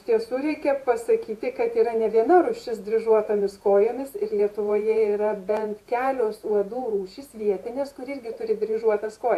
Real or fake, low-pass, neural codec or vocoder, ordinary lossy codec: fake; 14.4 kHz; vocoder, 48 kHz, 128 mel bands, Vocos; AAC, 64 kbps